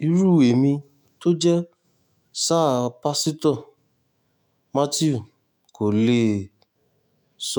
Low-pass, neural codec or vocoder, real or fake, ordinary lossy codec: none; autoencoder, 48 kHz, 128 numbers a frame, DAC-VAE, trained on Japanese speech; fake; none